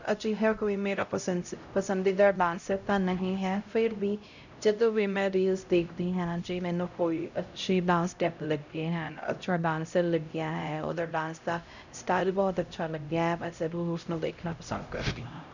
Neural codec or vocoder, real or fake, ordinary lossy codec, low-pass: codec, 16 kHz, 0.5 kbps, X-Codec, HuBERT features, trained on LibriSpeech; fake; none; 7.2 kHz